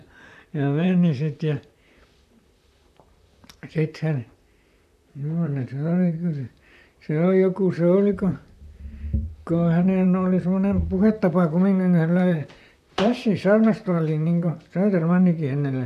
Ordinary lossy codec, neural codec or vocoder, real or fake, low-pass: none; vocoder, 44.1 kHz, 128 mel bands, Pupu-Vocoder; fake; 14.4 kHz